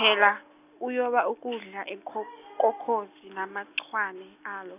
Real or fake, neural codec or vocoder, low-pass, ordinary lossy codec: real; none; 3.6 kHz; none